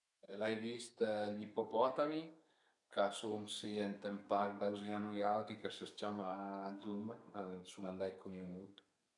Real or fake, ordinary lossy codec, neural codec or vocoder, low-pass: fake; none; codec, 32 kHz, 1.9 kbps, SNAC; 10.8 kHz